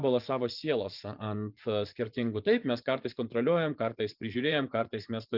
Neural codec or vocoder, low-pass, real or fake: vocoder, 24 kHz, 100 mel bands, Vocos; 5.4 kHz; fake